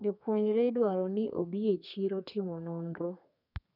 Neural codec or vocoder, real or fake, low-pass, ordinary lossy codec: codec, 32 kHz, 1.9 kbps, SNAC; fake; 5.4 kHz; none